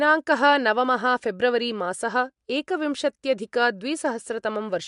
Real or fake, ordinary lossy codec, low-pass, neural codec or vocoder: real; MP3, 64 kbps; 10.8 kHz; none